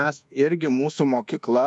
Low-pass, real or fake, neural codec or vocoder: 10.8 kHz; fake; codec, 24 kHz, 1.2 kbps, DualCodec